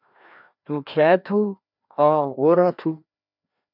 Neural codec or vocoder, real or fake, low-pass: codec, 16 kHz, 1 kbps, FreqCodec, larger model; fake; 5.4 kHz